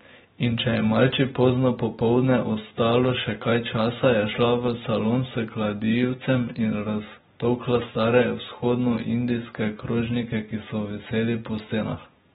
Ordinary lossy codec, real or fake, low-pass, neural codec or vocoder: AAC, 16 kbps; real; 19.8 kHz; none